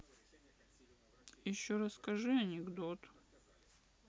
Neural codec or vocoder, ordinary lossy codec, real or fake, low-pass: none; none; real; none